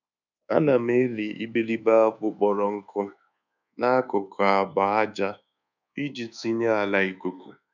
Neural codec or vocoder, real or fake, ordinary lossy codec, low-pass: codec, 24 kHz, 1.2 kbps, DualCodec; fake; none; 7.2 kHz